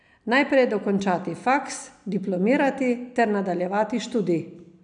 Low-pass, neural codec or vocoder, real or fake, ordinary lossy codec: 9.9 kHz; none; real; none